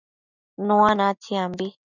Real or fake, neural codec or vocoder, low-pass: real; none; 7.2 kHz